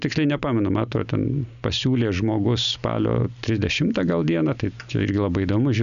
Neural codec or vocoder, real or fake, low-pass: none; real; 7.2 kHz